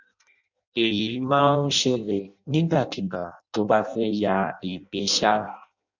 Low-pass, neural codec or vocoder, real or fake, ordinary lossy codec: 7.2 kHz; codec, 16 kHz in and 24 kHz out, 0.6 kbps, FireRedTTS-2 codec; fake; none